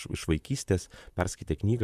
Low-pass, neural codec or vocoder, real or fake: 14.4 kHz; vocoder, 44.1 kHz, 128 mel bands, Pupu-Vocoder; fake